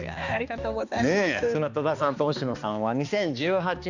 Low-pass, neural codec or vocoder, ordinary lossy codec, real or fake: 7.2 kHz; codec, 16 kHz, 2 kbps, X-Codec, HuBERT features, trained on balanced general audio; none; fake